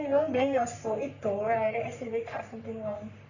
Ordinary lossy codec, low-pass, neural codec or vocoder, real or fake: none; 7.2 kHz; codec, 44.1 kHz, 3.4 kbps, Pupu-Codec; fake